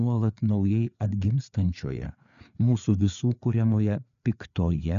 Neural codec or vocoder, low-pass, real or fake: codec, 16 kHz, 4 kbps, FreqCodec, larger model; 7.2 kHz; fake